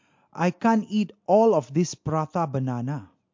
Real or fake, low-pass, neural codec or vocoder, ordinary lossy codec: real; 7.2 kHz; none; MP3, 48 kbps